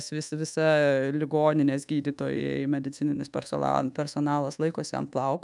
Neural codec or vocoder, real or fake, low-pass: codec, 24 kHz, 1.2 kbps, DualCodec; fake; 10.8 kHz